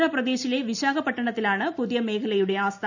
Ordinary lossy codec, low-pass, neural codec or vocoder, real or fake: none; 7.2 kHz; none; real